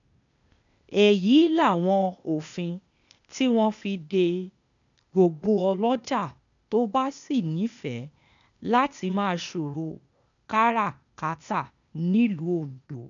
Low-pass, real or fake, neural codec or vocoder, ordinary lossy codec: 7.2 kHz; fake; codec, 16 kHz, 0.8 kbps, ZipCodec; none